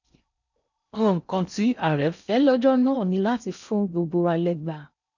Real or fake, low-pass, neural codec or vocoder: fake; 7.2 kHz; codec, 16 kHz in and 24 kHz out, 0.6 kbps, FocalCodec, streaming, 4096 codes